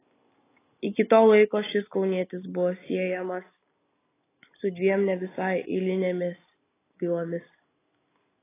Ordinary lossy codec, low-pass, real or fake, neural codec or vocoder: AAC, 16 kbps; 3.6 kHz; real; none